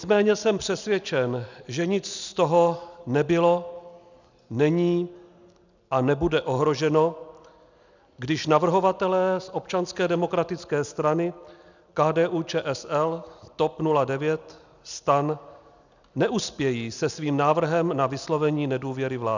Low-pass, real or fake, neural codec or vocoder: 7.2 kHz; real; none